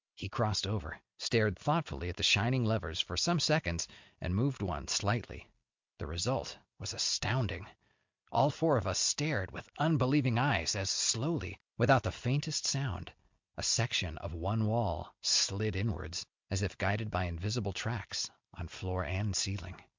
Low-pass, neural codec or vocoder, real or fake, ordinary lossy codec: 7.2 kHz; none; real; MP3, 64 kbps